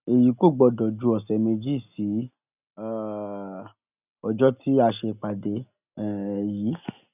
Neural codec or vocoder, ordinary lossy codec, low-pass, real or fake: none; none; 3.6 kHz; real